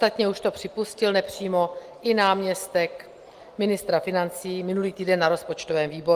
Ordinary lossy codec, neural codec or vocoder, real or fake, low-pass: Opus, 24 kbps; none; real; 14.4 kHz